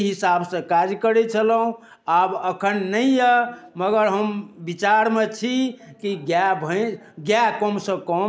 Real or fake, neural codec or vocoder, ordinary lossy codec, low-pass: real; none; none; none